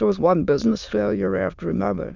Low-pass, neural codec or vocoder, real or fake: 7.2 kHz; autoencoder, 22.05 kHz, a latent of 192 numbers a frame, VITS, trained on many speakers; fake